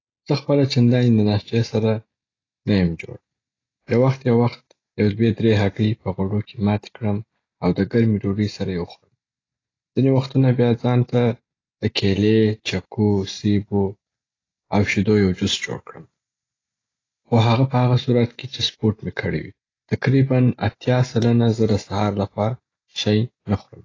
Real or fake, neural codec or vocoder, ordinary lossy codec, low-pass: real; none; AAC, 32 kbps; 7.2 kHz